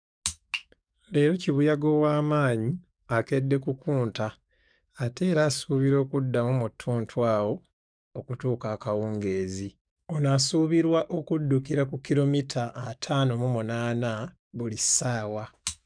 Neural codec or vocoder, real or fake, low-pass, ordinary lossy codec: codec, 44.1 kHz, 7.8 kbps, DAC; fake; 9.9 kHz; none